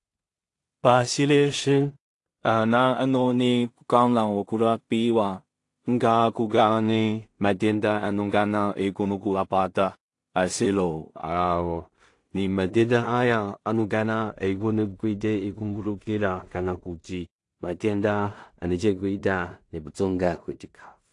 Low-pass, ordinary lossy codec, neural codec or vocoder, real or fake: 10.8 kHz; AAC, 48 kbps; codec, 16 kHz in and 24 kHz out, 0.4 kbps, LongCat-Audio-Codec, two codebook decoder; fake